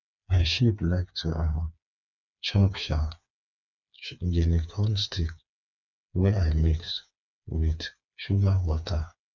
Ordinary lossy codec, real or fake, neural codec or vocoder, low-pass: none; fake; codec, 16 kHz, 4 kbps, FreqCodec, smaller model; 7.2 kHz